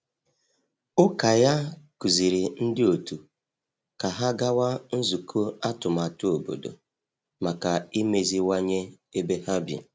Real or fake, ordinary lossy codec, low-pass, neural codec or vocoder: real; none; none; none